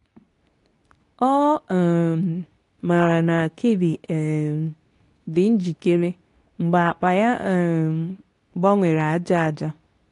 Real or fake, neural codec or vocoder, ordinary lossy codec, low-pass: fake; codec, 24 kHz, 0.9 kbps, WavTokenizer, medium speech release version 2; AAC, 48 kbps; 10.8 kHz